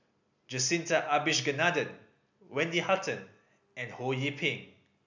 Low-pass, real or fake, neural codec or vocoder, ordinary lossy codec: 7.2 kHz; real; none; none